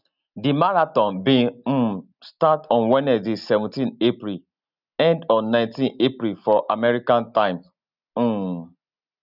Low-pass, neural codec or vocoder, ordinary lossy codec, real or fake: 5.4 kHz; none; none; real